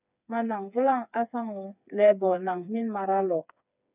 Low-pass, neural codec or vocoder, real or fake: 3.6 kHz; codec, 16 kHz, 4 kbps, FreqCodec, smaller model; fake